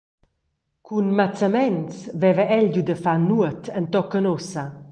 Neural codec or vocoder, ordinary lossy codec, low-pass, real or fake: none; Opus, 24 kbps; 9.9 kHz; real